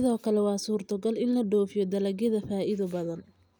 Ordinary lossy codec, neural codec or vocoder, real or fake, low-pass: none; none; real; none